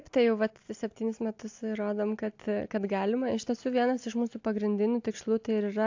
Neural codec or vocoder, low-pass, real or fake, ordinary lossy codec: none; 7.2 kHz; real; AAC, 48 kbps